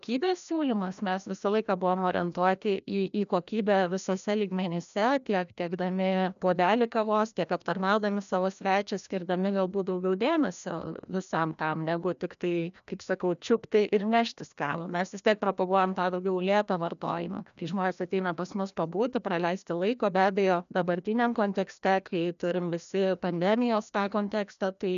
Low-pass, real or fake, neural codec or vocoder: 7.2 kHz; fake; codec, 16 kHz, 1 kbps, FreqCodec, larger model